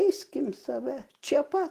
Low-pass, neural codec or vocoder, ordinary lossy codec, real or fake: 14.4 kHz; none; Opus, 16 kbps; real